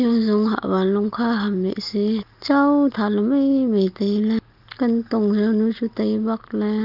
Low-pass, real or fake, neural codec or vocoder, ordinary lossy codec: 5.4 kHz; real; none; Opus, 24 kbps